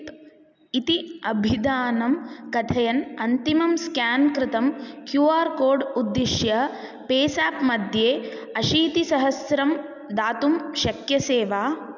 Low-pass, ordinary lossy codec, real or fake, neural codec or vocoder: 7.2 kHz; none; real; none